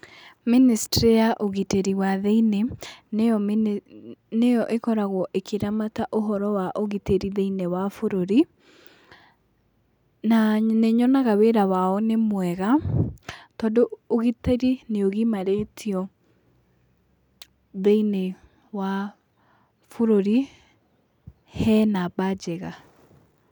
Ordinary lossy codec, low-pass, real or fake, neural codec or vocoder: none; 19.8 kHz; real; none